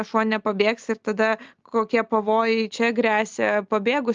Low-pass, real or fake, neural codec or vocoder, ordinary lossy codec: 7.2 kHz; real; none; Opus, 32 kbps